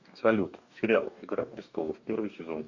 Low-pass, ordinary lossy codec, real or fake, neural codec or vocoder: 7.2 kHz; Opus, 64 kbps; fake; codec, 44.1 kHz, 2.6 kbps, DAC